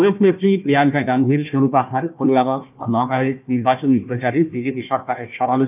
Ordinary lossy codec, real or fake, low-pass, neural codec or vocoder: none; fake; 3.6 kHz; codec, 16 kHz, 1 kbps, FunCodec, trained on Chinese and English, 50 frames a second